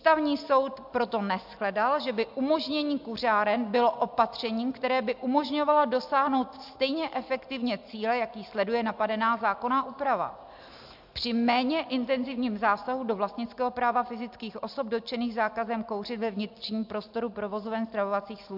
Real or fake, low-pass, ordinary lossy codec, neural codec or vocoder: real; 5.4 kHz; MP3, 48 kbps; none